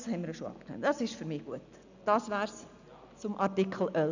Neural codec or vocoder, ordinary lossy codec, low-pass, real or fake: none; none; 7.2 kHz; real